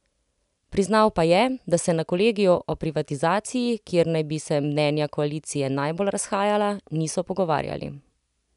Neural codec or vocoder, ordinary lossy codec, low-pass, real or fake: none; none; 10.8 kHz; real